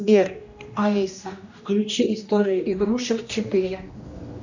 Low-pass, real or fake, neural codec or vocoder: 7.2 kHz; fake; codec, 16 kHz, 1 kbps, X-Codec, HuBERT features, trained on general audio